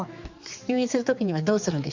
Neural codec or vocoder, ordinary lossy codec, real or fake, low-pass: codec, 16 kHz, 4 kbps, X-Codec, HuBERT features, trained on general audio; Opus, 64 kbps; fake; 7.2 kHz